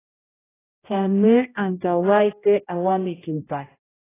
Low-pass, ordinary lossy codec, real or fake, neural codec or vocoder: 3.6 kHz; AAC, 16 kbps; fake; codec, 16 kHz, 0.5 kbps, X-Codec, HuBERT features, trained on general audio